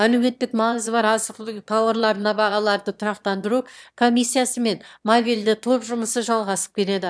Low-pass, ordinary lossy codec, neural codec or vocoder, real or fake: none; none; autoencoder, 22.05 kHz, a latent of 192 numbers a frame, VITS, trained on one speaker; fake